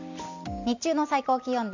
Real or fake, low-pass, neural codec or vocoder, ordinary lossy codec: real; 7.2 kHz; none; MP3, 64 kbps